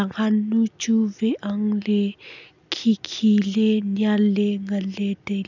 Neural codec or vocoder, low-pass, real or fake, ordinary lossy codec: none; 7.2 kHz; real; none